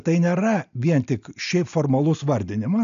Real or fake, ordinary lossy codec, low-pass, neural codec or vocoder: real; AAC, 96 kbps; 7.2 kHz; none